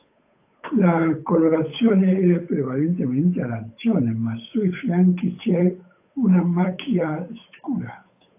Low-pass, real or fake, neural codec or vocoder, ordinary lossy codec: 3.6 kHz; fake; codec, 16 kHz, 8 kbps, FunCodec, trained on Chinese and English, 25 frames a second; AAC, 24 kbps